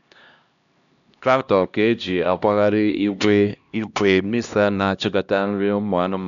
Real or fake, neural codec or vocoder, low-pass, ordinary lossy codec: fake; codec, 16 kHz, 1 kbps, X-Codec, HuBERT features, trained on LibriSpeech; 7.2 kHz; none